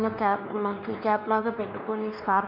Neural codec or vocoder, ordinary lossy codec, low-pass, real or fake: codec, 16 kHz, 2 kbps, X-Codec, WavLM features, trained on Multilingual LibriSpeech; none; 5.4 kHz; fake